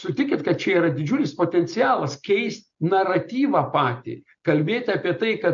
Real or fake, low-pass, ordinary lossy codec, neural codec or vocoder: real; 7.2 kHz; MP3, 48 kbps; none